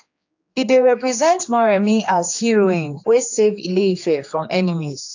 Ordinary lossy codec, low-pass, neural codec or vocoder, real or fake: AAC, 48 kbps; 7.2 kHz; codec, 16 kHz, 2 kbps, X-Codec, HuBERT features, trained on general audio; fake